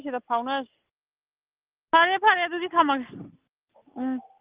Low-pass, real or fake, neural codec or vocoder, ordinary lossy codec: 3.6 kHz; real; none; Opus, 32 kbps